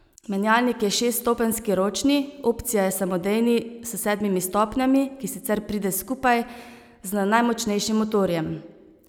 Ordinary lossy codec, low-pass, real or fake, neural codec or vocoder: none; none; real; none